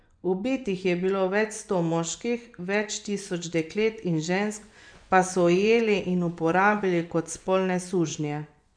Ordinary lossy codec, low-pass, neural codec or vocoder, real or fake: none; 9.9 kHz; vocoder, 24 kHz, 100 mel bands, Vocos; fake